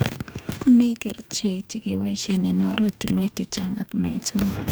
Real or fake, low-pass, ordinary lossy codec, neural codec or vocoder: fake; none; none; codec, 44.1 kHz, 2.6 kbps, DAC